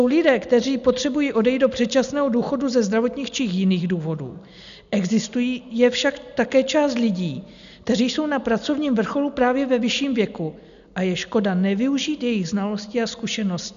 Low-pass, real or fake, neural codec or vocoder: 7.2 kHz; real; none